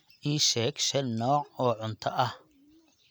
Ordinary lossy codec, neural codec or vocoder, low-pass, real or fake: none; none; none; real